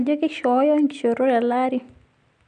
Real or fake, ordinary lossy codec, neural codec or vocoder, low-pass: real; none; none; 9.9 kHz